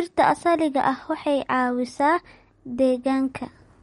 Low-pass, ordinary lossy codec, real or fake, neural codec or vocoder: 19.8 kHz; MP3, 48 kbps; real; none